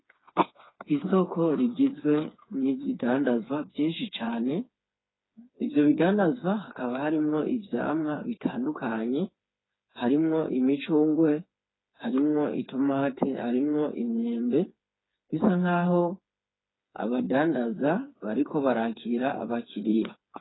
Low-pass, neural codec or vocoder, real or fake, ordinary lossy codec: 7.2 kHz; codec, 16 kHz, 4 kbps, FreqCodec, smaller model; fake; AAC, 16 kbps